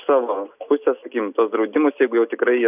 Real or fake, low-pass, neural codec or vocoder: real; 3.6 kHz; none